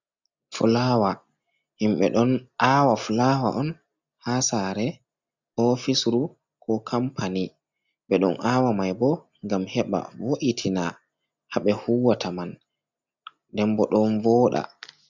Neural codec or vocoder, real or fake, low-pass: none; real; 7.2 kHz